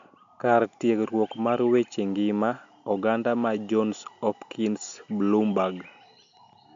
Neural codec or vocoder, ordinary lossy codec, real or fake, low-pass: none; MP3, 64 kbps; real; 7.2 kHz